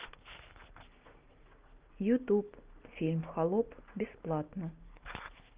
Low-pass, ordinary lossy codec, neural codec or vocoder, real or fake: 3.6 kHz; Opus, 32 kbps; none; real